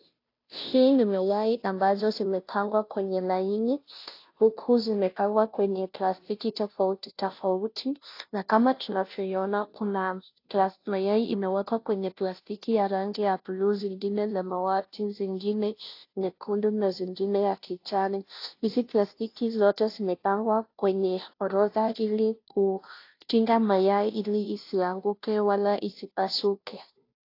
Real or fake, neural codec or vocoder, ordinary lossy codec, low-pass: fake; codec, 16 kHz, 0.5 kbps, FunCodec, trained on Chinese and English, 25 frames a second; AAC, 32 kbps; 5.4 kHz